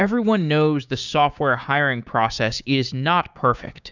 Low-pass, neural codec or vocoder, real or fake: 7.2 kHz; codec, 16 kHz, 6 kbps, DAC; fake